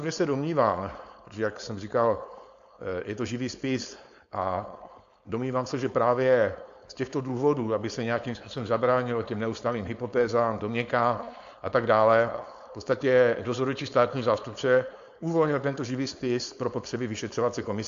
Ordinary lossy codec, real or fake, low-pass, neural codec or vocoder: AAC, 64 kbps; fake; 7.2 kHz; codec, 16 kHz, 4.8 kbps, FACodec